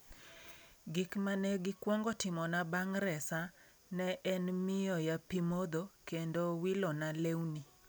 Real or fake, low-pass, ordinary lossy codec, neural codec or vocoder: real; none; none; none